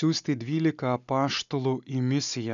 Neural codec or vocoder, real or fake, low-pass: none; real; 7.2 kHz